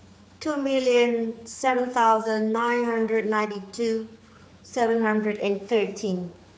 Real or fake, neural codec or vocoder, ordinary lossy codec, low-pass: fake; codec, 16 kHz, 4 kbps, X-Codec, HuBERT features, trained on general audio; none; none